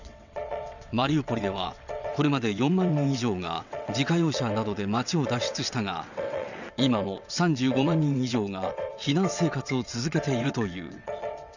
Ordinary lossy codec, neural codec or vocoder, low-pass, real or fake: none; vocoder, 22.05 kHz, 80 mel bands, WaveNeXt; 7.2 kHz; fake